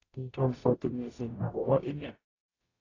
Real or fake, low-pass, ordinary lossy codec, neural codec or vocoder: fake; 7.2 kHz; AAC, 32 kbps; codec, 44.1 kHz, 0.9 kbps, DAC